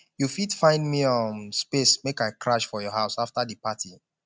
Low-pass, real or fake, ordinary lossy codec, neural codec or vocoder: none; real; none; none